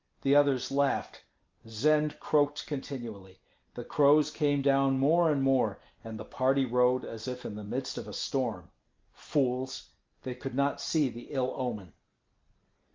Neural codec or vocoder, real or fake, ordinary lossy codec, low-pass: none; real; Opus, 24 kbps; 7.2 kHz